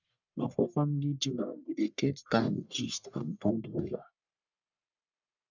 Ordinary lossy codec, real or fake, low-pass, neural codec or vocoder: none; fake; 7.2 kHz; codec, 44.1 kHz, 1.7 kbps, Pupu-Codec